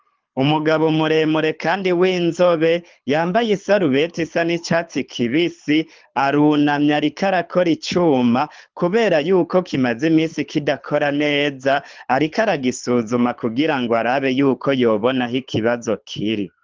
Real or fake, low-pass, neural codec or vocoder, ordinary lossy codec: fake; 7.2 kHz; codec, 24 kHz, 6 kbps, HILCodec; Opus, 32 kbps